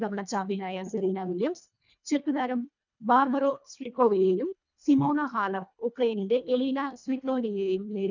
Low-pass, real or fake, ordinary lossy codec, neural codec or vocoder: 7.2 kHz; fake; none; codec, 24 kHz, 1.5 kbps, HILCodec